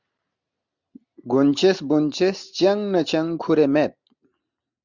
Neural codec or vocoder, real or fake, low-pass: none; real; 7.2 kHz